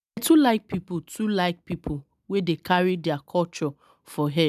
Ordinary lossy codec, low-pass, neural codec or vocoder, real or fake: none; 14.4 kHz; none; real